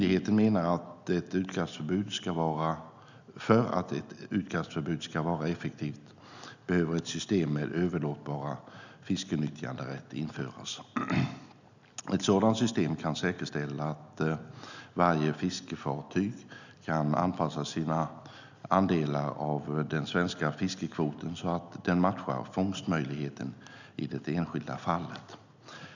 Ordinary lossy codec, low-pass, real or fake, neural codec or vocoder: none; 7.2 kHz; real; none